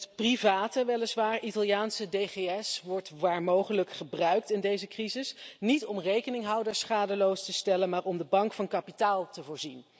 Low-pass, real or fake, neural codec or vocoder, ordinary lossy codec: none; real; none; none